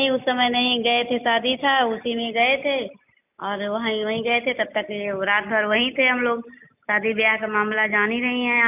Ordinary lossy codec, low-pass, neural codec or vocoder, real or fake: none; 3.6 kHz; none; real